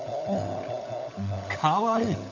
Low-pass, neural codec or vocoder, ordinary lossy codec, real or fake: 7.2 kHz; codec, 16 kHz, 16 kbps, FunCodec, trained on LibriTTS, 50 frames a second; AAC, 48 kbps; fake